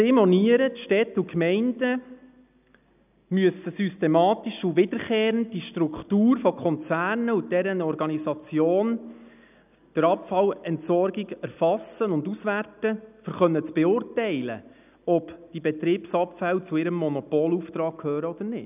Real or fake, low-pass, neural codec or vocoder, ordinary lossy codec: real; 3.6 kHz; none; none